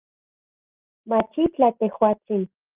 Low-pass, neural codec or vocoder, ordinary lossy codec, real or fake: 3.6 kHz; none; Opus, 32 kbps; real